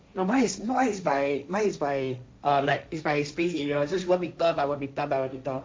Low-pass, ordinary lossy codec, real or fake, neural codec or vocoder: none; none; fake; codec, 16 kHz, 1.1 kbps, Voila-Tokenizer